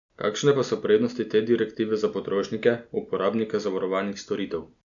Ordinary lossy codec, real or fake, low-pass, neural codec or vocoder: none; real; 7.2 kHz; none